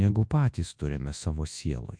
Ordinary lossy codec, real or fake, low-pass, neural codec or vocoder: MP3, 64 kbps; fake; 9.9 kHz; codec, 24 kHz, 0.9 kbps, WavTokenizer, large speech release